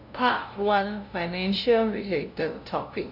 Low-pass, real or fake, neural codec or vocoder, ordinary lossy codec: 5.4 kHz; fake; codec, 16 kHz, 0.5 kbps, FunCodec, trained on LibriTTS, 25 frames a second; none